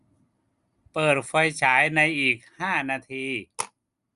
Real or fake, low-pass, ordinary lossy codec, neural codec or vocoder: real; 10.8 kHz; Opus, 64 kbps; none